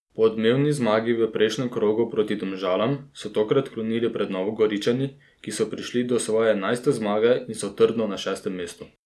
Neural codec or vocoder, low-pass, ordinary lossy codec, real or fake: none; none; none; real